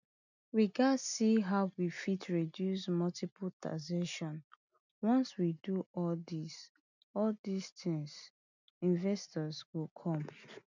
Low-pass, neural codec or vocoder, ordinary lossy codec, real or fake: 7.2 kHz; none; none; real